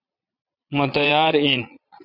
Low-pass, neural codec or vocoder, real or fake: 5.4 kHz; vocoder, 22.05 kHz, 80 mel bands, Vocos; fake